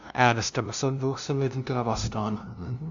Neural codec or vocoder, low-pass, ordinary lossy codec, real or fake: codec, 16 kHz, 0.5 kbps, FunCodec, trained on LibriTTS, 25 frames a second; 7.2 kHz; AAC, 64 kbps; fake